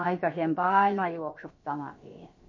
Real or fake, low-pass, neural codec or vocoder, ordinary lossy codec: fake; 7.2 kHz; codec, 16 kHz, about 1 kbps, DyCAST, with the encoder's durations; MP3, 32 kbps